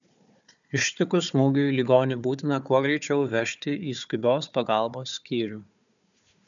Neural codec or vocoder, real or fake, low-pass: codec, 16 kHz, 4 kbps, FunCodec, trained on Chinese and English, 50 frames a second; fake; 7.2 kHz